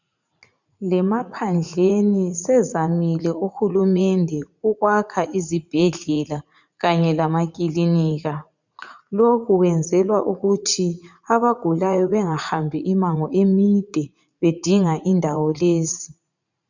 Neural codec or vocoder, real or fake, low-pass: vocoder, 44.1 kHz, 80 mel bands, Vocos; fake; 7.2 kHz